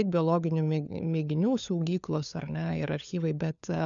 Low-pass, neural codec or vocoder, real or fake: 7.2 kHz; codec, 16 kHz, 4 kbps, FunCodec, trained on LibriTTS, 50 frames a second; fake